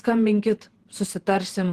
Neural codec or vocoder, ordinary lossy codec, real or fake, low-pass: vocoder, 48 kHz, 128 mel bands, Vocos; Opus, 16 kbps; fake; 14.4 kHz